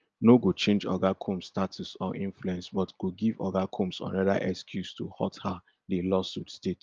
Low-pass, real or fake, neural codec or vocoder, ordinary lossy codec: 7.2 kHz; real; none; Opus, 24 kbps